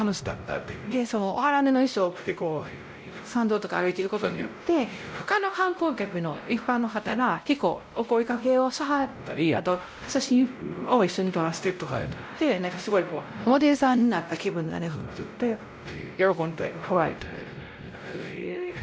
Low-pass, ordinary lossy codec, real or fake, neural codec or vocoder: none; none; fake; codec, 16 kHz, 0.5 kbps, X-Codec, WavLM features, trained on Multilingual LibriSpeech